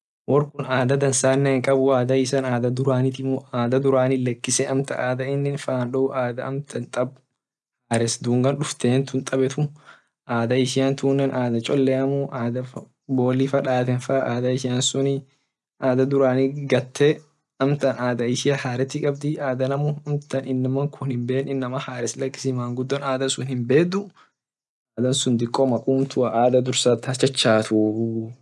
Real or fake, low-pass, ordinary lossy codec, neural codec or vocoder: real; none; none; none